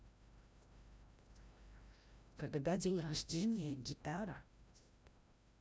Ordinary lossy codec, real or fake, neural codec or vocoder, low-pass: none; fake; codec, 16 kHz, 0.5 kbps, FreqCodec, larger model; none